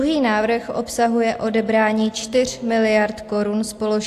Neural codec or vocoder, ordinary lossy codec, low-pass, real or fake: none; AAC, 64 kbps; 14.4 kHz; real